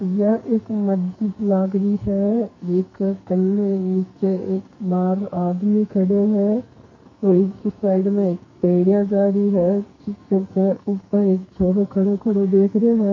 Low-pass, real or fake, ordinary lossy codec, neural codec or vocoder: 7.2 kHz; fake; MP3, 32 kbps; codec, 44.1 kHz, 2.6 kbps, SNAC